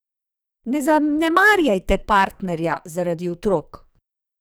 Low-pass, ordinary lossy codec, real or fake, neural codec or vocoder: none; none; fake; codec, 44.1 kHz, 2.6 kbps, SNAC